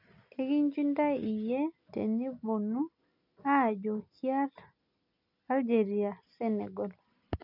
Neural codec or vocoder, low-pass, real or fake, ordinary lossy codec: none; 5.4 kHz; real; none